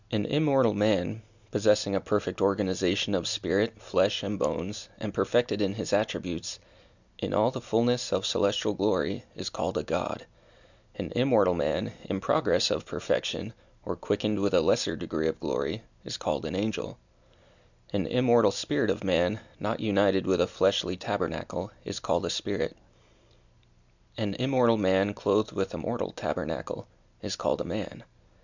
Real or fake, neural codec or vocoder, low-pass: real; none; 7.2 kHz